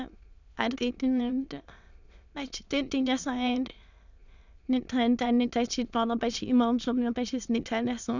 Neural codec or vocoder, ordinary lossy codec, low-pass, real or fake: autoencoder, 22.05 kHz, a latent of 192 numbers a frame, VITS, trained on many speakers; none; 7.2 kHz; fake